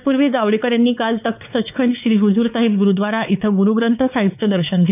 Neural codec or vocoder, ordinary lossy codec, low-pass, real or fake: codec, 16 kHz, 4 kbps, X-Codec, WavLM features, trained on Multilingual LibriSpeech; none; 3.6 kHz; fake